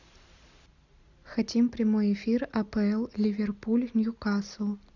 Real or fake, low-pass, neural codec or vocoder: real; 7.2 kHz; none